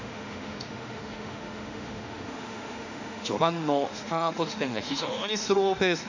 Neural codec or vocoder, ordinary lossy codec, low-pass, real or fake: autoencoder, 48 kHz, 32 numbers a frame, DAC-VAE, trained on Japanese speech; none; 7.2 kHz; fake